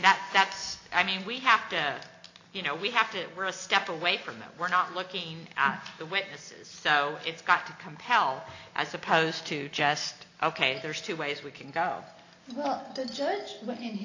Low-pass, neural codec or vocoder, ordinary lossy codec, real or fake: 7.2 kHz; none; AAC, 48 kbps; real